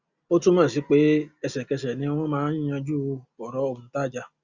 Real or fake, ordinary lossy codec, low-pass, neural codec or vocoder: real; Opus, 64 kbps; 7.2 kHz; none